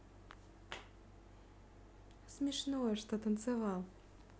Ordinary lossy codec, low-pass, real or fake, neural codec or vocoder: none; none; real; none